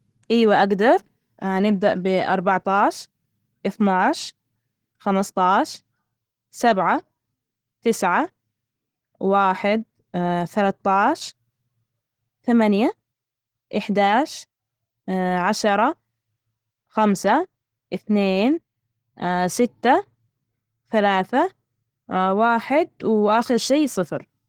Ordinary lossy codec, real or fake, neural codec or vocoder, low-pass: Opus, 16 kbps; real; none; 19.8 kHz